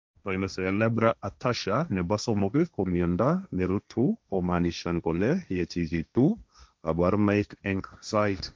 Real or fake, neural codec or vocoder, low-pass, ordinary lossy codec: fake; codec, 16 kHz, 1.1 kbps, Voila-Tokenizer; none; none